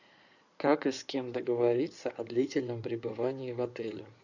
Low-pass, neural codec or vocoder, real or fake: 7.2 kHz; codec, 16 kHz in and 24 kHz out, 2.2 kbps, FireRedTTS-2 codec; fake